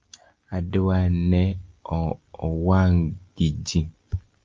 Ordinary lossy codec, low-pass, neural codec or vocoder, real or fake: Opus, 32 kbps; 7.2 kHz; none; real